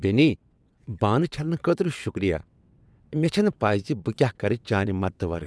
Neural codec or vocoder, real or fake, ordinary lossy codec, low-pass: vocoder, 22.05 kHz, 80 mel bands, WaveNeXt; fake; none; none